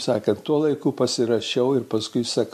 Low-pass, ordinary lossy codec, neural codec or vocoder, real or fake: 14.4 kHz; MP3, 64 kbps; none; real